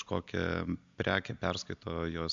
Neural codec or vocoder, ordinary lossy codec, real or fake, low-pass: none; MP3, 64 kbps; real; 7.2 kHz